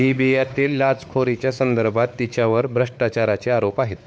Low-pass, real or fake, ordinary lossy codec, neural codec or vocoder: none; fake; none; codec, 16 kHz, 4 kbps, X-Codec, WavLM features, trained on Multilingual LibriSpeech